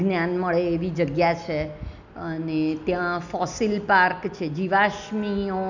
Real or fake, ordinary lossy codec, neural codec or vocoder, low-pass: real; none; none; 7.2 kHz